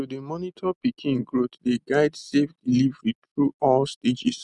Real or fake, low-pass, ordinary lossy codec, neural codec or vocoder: real; 10.8 kHz; none; none